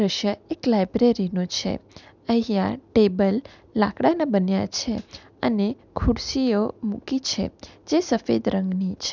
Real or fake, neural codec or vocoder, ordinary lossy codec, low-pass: real; none; none; 7.2 kHz